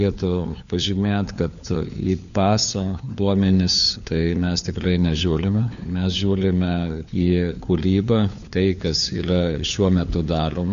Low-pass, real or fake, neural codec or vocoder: 7.2 kHz; fake; codec, 16 kHz, 4 kbps, FunCodec, trained on LibriTTS, 50 frames a second